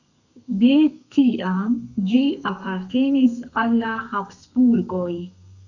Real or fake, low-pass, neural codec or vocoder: fake; 7.2 kHz; codec, 32 kHz, 1.9 kbps, SNAC